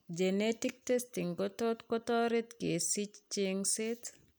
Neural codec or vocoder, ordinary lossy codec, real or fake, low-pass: none; none; real; none